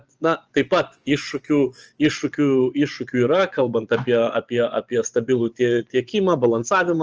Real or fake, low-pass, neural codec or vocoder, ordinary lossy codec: fake; 7.2 kHz; vocoder, 24 kHz, 100 mel bands, Vocos; Opus, 24 kbps